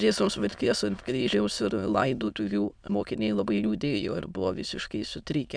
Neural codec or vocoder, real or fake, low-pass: autoencoder, 22.05 kHz, a latent of 192 numbers a frame, VITS, trained on many speakers; fake; 9.9 kHz